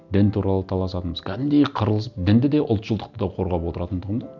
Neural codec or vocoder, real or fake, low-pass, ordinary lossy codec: none; real; 7.2 kHz; none